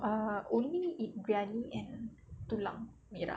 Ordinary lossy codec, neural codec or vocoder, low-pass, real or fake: none; none; none; real